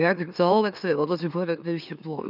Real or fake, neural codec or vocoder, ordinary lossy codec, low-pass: fake; autoencoder, 44.1 kHz, a latent of 192 numbers a frame, MeloTTS; none; 5.4 kHz